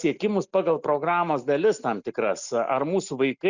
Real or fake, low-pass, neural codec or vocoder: real; 7.2 kHz; none